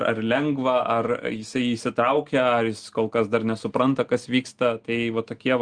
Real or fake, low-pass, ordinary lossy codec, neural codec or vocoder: real; 9.9 kHz; Opus, 24 kbps; none